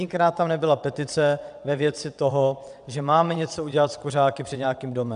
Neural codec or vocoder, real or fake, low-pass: vocoder, 22.05 kHz, 80 mel bands, WaveNeXt; fake; 9.9 kHz